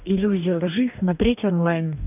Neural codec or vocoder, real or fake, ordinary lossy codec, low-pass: codec, 44.1 kHz, 2.6 kbps, DAC; fake; none; 3.6 kHz